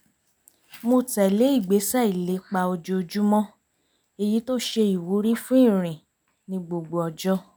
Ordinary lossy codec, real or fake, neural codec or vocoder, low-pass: none; real; none; none